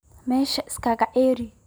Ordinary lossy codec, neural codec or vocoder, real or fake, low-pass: none; none; real; none